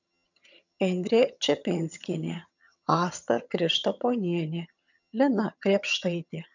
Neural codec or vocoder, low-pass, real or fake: vocoder, 22.05 kHz, 80 mel bands, HiFi-GAN; 7.2 kHz; fake